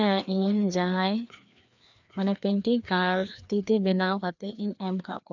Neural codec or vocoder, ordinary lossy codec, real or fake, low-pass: codec, 16 kHz, 2 kbps, FreqCodec, larger model; none; fake; 7.2 kHz